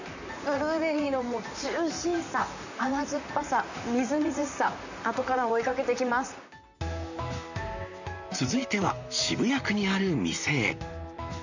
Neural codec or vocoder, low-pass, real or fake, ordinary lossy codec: vocoder, 44.1 kHz, 128 mel bands, Pupu-Vocoder; 7.2 kHz; fake; none